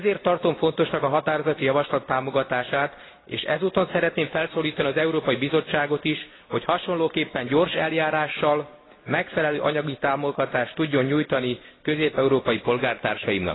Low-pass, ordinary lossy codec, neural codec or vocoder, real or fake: 7.2 kHz; AAC, 16 kbps; none; real